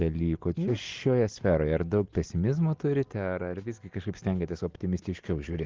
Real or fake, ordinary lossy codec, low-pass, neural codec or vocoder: real; Opus, 16 kbps; 7.2 kHz; none